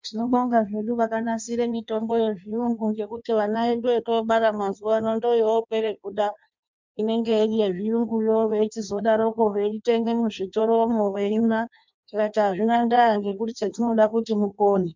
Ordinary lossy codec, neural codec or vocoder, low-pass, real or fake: MP3, 64 kbps; codec, 16 kHz in and 24 kHz out, 1.1 kbps, FireRedTTS-2 codec; 7.2 kHz; fake